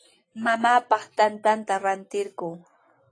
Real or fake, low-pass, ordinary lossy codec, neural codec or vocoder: real; 9.9 kHz; AAC, 32 kbps; none